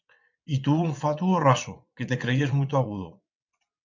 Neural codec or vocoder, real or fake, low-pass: vocoder, 22.05 kHz, 80 mel bands, WaveNeXt; fake; 7.2 kHz